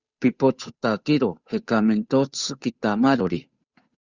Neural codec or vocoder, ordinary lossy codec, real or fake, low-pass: codec, 16 kHz, 8 kbps, FunCodec, trained on Chinese and English, 25 frames a second; Opus, 64 kbps; fake; 7.2 kHz